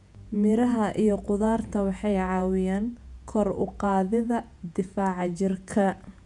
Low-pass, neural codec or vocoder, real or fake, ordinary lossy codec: 10.8 kHz; vocoder, 48 kHz, 128 mel bands, Vocos; fake; none